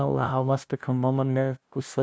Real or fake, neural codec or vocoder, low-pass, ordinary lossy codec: fake; codec, 16 kHz, 0.5 kbps, FunCodec, trained on LibriTTS, 25 frames a second; none; none